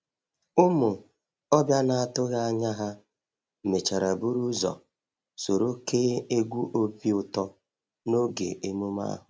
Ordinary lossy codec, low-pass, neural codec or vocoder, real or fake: none; none; none; real